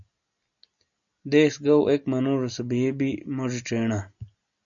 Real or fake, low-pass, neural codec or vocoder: real; 7.2 kHz; none